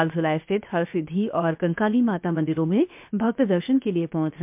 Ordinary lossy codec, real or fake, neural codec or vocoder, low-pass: MP3, 32 kbps; fake; codec, 16 kHz, 0.7 kbps, FocalCodec; 3.6 kHz